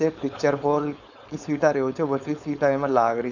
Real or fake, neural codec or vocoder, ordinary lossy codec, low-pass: fake; codec, 16 kHz, 4.8 kbps, FACodec; none; 7.2 kHz